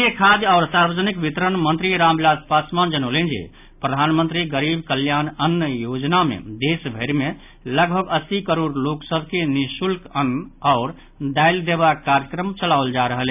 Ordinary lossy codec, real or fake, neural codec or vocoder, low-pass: none; real; none; 3.6 kHz